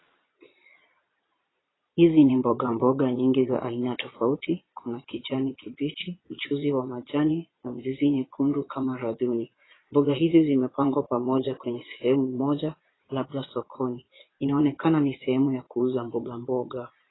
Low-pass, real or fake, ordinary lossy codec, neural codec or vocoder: 7.2 kHz; fake; AAC, 16 kbps; vocoder, 22.05 kHz, 80 mel bands, Vocos